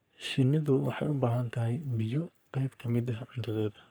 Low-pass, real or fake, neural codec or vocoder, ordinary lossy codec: none; fake; codec, 44.1 kHz, 3.4 kbps, Pupu-Codec; none